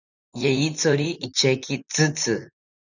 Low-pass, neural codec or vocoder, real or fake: 7.2 kHz; vocoder, 44.1 kHz, 128 mel bands, Pupu-Vocoder; fake